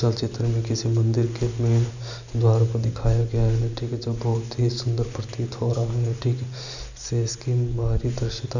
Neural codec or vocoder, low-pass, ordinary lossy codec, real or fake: none; 7.2 kHz; none; real